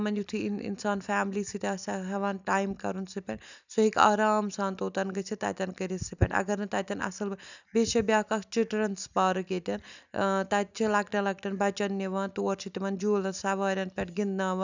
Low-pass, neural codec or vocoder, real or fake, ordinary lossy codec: 7.2 kHz; none; real; none